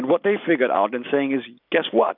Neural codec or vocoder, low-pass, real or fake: none; 5.4 kHz; real